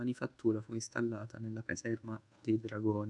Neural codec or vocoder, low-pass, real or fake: codec, 24 kHz, 1.2 kbps, DualCodec; 10.8 kHz; fake